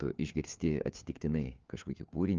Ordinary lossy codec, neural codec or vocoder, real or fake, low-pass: Opus, 24 kbps; codec, 16 kHz, 4 kbps, FunCodec, trained on LibriTTS, 50 frames a second; fake; 7.2 kHz